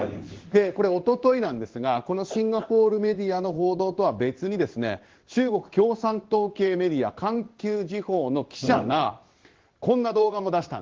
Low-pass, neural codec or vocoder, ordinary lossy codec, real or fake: 7.2 kHz; codec, 16 kHz in and 24 kHz out, 1 kbps, XY-Tokenizer; Opus, 16 kbps; fake